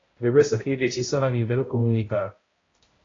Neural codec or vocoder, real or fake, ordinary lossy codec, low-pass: codec, 16 kHz, 0.5 kbps, X-Codec, HuBERT features, trained on balanced general audio; fake; AAC, 32 kbps; 7.2 kHz